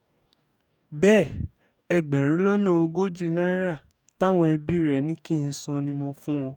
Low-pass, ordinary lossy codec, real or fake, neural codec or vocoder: 19.8 kHz; none; fake; codec, 44.1 kHz, 2.6 kbps, DAC